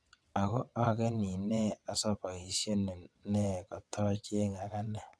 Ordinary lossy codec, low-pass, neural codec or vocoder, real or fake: none; none; vocoder, 22.05 kHz, 80 mel bands, WaveNeXt; fake